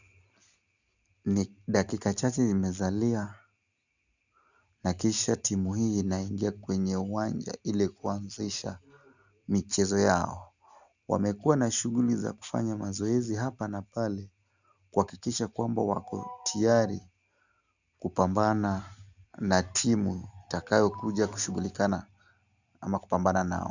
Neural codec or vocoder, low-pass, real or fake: none; 7.2 kHz; real